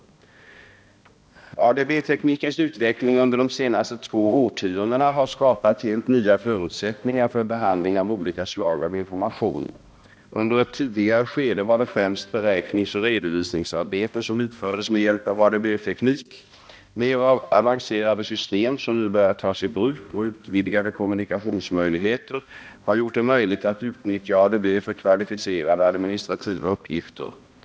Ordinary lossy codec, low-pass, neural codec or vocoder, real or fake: none; none; codec, 16 kHz, 1 kbps, X-Codec, HuBERT features, trained on balanced general audio; fake